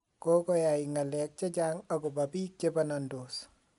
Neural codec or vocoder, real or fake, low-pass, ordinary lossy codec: none; real; 10.8 kHz; none